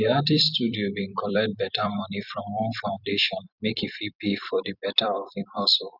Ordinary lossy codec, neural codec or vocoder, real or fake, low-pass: none; vocoder, 44.1 kHz, 128 mel bands every 256 samples, BigVGAN v2; fake; 5.4 kHz